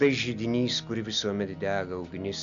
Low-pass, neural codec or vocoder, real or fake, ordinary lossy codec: 7.2 kHz; none; real; AAC, 64 kbps